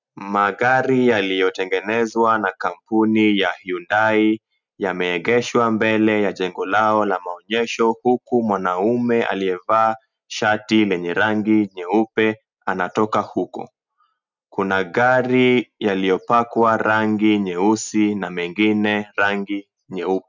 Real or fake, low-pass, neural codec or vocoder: real; 7.2 kHz; none